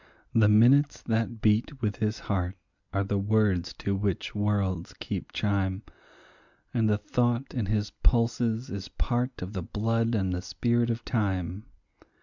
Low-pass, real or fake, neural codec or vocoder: 7.2 kHz; real; none